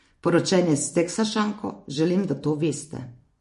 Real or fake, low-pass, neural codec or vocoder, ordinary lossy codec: fake; 10.8 kHz; vocoder, 24 kHz, 100 mel bands, Vocos; MP3, 48 kbps